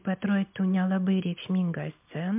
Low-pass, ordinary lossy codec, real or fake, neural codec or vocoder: 3.6 kHz; MP3, 32 kbps; real; none